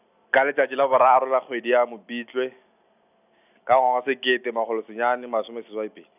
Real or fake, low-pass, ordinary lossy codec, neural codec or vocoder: real; 3.6 kHz; none; none